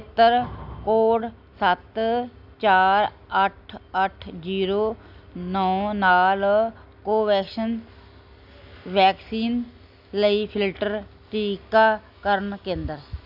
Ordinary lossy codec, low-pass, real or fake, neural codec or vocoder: none; 5.4 kHz; real; none